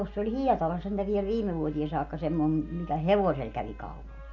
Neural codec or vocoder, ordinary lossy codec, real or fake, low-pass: none; none; real; 7.2 kHz